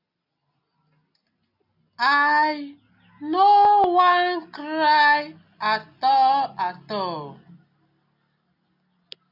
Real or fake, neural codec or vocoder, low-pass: real; none; 5.4 kHz